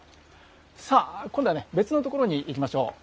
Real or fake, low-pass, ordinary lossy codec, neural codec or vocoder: real; none; none; none